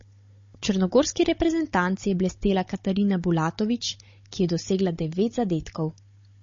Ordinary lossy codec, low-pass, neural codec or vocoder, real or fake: MP3, 32 kbps; 7.2 kHz; codec, 16 kHz, 16 kbps, FunCodec, trained on Chinese and English, 50 frames a second; fake